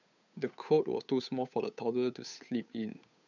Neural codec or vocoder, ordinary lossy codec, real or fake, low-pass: codec, 16 kHz, 8 kbps, FunCodec, trained on Chinese and English, 25 frames a second; none; fake; 7.2 kHz